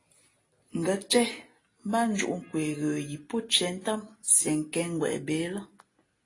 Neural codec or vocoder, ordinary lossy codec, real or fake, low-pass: none; AAC, 32 kbps; real; 10.8 kHz